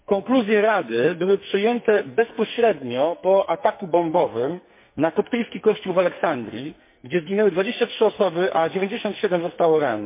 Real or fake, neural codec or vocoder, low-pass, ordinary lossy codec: fake; codec, 44.1 kHz, 2.6 kbps, SNAC; 3.6 kHz; MP3, 24 kbps